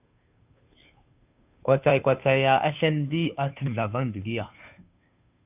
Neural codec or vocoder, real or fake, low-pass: codec, 16 kHz, 2 kbps, FunCodec, trained on Chinese and English, 25 frames a second; fake; 3.6 kHz